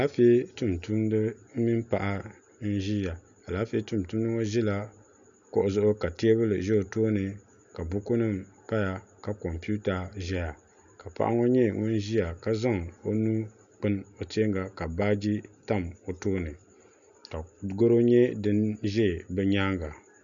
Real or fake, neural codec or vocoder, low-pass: real; none; 7.2 kHz